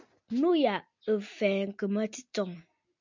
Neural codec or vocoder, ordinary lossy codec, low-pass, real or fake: none; MP3, 64 kbps; 7.2 kHz; real